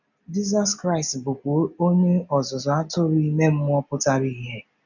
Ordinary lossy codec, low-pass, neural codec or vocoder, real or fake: none; 7.2 kHz; none; real